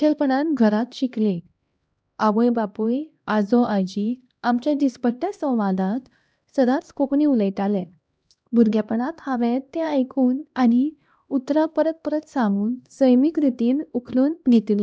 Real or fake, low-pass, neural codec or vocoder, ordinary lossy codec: fake; none; codec, 16 kHz, 1 kbps, X-Codec, HuBERT features, trained on LibriSpeech; none